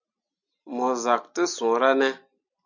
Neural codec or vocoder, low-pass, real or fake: none; 7.2 kHz; real